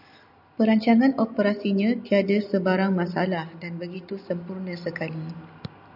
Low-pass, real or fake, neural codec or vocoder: 5.4 kHz; real; none